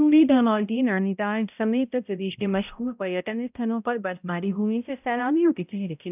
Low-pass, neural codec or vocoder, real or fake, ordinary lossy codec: 3.6 kHz; codec, 16 kHz, 0.5 kbps, X-Codec, HuBERT features, trained on balanced general audio; fake; none